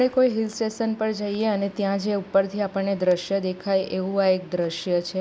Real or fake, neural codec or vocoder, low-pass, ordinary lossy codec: real; none; none; none